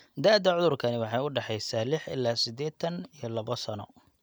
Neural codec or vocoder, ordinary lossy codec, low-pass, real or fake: none; none; none; real